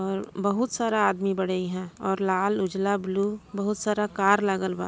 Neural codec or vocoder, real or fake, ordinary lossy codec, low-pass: none; real; none; none